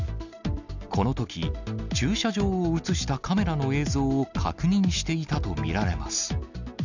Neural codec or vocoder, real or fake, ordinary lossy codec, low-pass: none; real; none; 7.2 kHz